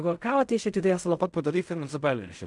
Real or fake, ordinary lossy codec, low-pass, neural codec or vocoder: fake; MP3, 96 kbps; 10.8 kHz; codec, 16 kHz in and 24 kHz out, 0.4 kbps, LongCat-Audio-Codec, fine tuned four codebook decoder